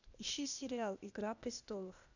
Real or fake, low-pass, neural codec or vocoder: fake; 7.2 kHz; codec, 16 kHz, 0.8 kbps, ZipCodec